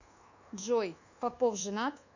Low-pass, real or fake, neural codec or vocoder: 7.2 kHz; fake; codec, 24 kHz, 1.2 kbps, DualCodec